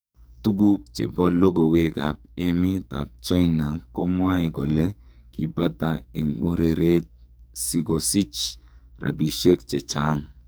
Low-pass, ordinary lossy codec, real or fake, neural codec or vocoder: none; none; fake; codec, 44.1 kHz, 2.6 kbps, SNAC